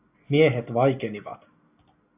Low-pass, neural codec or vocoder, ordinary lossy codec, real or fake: 3.6 kHz; none; AAC, 32 kbps; real